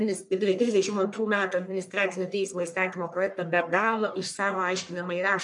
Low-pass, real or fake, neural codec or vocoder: 10.8 kHz; fake; codec, 44.1 kHz, 1.7 kbps, Pupu-Codec